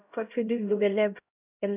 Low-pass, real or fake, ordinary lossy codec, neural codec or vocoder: 3.6 kHz; fake; none; codec, 16 kHz, 0.5 kbps, X-Codec, HuBERT features, trained on LibriSpeech